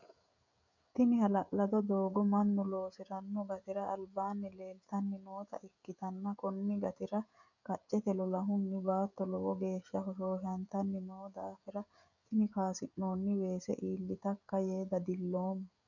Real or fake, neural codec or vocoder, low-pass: fake; codec, 16 kHz, 16 kbps, FreqCodec, smaller model; 7.2 kHz